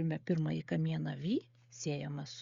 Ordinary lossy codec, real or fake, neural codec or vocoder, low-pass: Opus, 64 kbps; fake; codec, 16 kHz, 16 kbps, FreqCodec, smaller model; 7.2 kHz